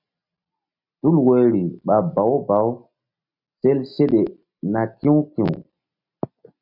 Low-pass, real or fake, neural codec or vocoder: 5.4 kHz; real; none